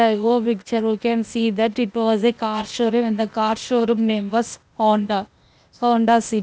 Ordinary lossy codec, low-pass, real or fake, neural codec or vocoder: none; none; fake; codec, 16 kHz, 0.8 kbps, ZipCodec